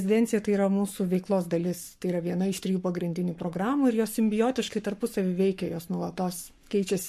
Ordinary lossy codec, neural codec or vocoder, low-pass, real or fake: MP3, 64 kbps; codec, 44.1 kHz, 7.8 kbps, Pupu-Codec; 14.4 kHz; fake